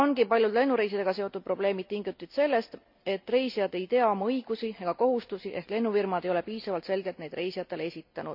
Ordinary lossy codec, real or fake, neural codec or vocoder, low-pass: none; real; none; 5.4 kHz